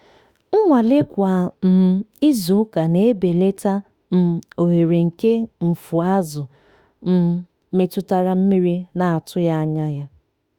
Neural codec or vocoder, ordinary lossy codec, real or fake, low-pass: autoencoder, 48 kHz, 32 numbers a frame, DAC-VAE, trained on Japanese speech; Opus, 64 kbps; fake; 19.8 kHz